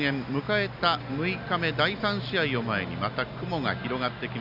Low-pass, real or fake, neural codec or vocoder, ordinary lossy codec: 5.4 kHz; real; none; AAC, 48 kbps